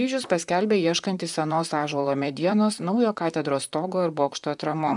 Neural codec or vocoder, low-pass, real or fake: vocoder, 44.1 kHz, 128 mel bands, Pupu-Vocoder; 10.8 kHz; fake